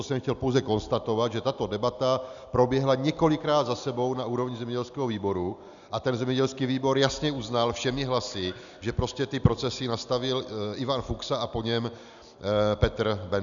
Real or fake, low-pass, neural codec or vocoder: real; 7.2 kHz; none